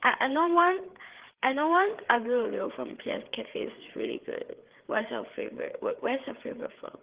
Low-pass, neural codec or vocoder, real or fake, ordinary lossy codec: 3.6 kHz; codec, 16 kHz, 4 kbps, FreqCodec, larger model; fake; Opus, 16 kbps